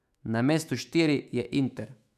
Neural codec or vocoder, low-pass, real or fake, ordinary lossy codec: autoencoder, 48 kHz, 128 numbers a frame, DAC-VAE, trained on Japanese speech; 14.4 kHz; fake; none